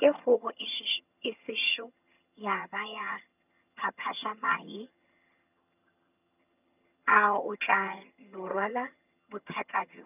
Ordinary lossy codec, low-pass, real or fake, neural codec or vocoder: none; 3.6 kHz; fake; vocoder, 22.05 kHz, 80 mel bands, HiFi-GAN